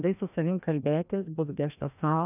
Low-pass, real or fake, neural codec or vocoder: 3.6 kHz; fake; codec, 16 kHz, 1 kbps, FreqCodec, larger model